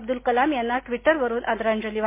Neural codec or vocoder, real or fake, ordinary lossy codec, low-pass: none; real; MP3, 24 kbps; 3.6 kHz